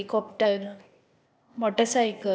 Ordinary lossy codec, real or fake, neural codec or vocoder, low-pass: none; fake; codec, 16 kHz, 0.8 kbps, ZipCodec; none